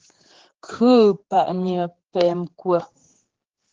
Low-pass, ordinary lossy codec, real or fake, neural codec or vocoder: 7.2 kHz; Opus, 16 kbps; fake; codec, 16 kHz, 4 kbps, X-Codec, HuBERT features, trained on general audio